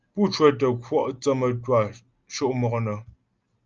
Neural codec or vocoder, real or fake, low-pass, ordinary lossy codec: none; real; 7.2 kHz; Opus, 24 kbps